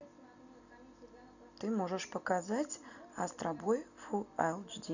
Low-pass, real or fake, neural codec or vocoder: 7.2 kHz; real; none